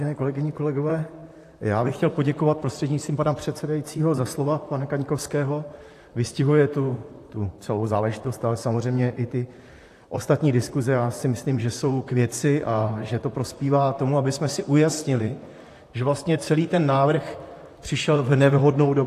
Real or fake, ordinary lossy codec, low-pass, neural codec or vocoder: fake; AAC, 64 kbps; 14.4 kHz; vocoder, 44.1 kHz, 128 mel bands, Pupu-Vocoder